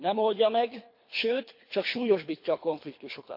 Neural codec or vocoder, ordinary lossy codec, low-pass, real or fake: codec, 24 kHz, 3 kbps, HILCodec; MP3, 32 kbps; 5.4 kHz; fake